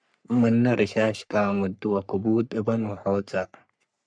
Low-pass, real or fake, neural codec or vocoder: 9.9 kHz; fake; codec, 44.1 kHz, 3.4 kbps, Pupu-Codec